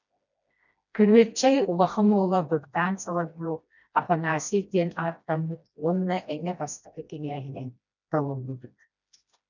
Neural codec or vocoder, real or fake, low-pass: codec, 16 kHz, 1 kbps, FreqCodec, smaller model; fake; 7.2 kHz